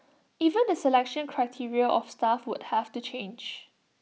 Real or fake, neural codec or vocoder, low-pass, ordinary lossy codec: real; none; none; none